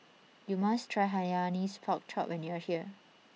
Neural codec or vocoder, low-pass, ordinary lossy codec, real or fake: none; none; none; real